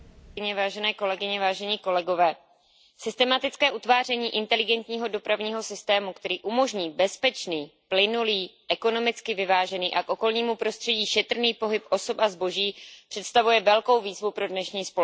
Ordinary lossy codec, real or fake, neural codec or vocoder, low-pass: none; real; none; none